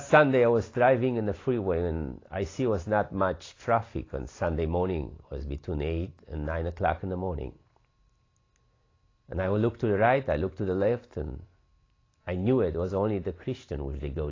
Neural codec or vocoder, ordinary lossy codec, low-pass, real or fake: none; AAC, 32 kbps; 7.2 kHz; real